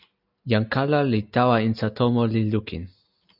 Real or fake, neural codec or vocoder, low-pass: real; none; 5.4 kHz